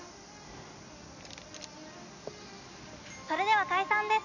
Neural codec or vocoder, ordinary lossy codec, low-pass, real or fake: none; none; 7.2 kHz; real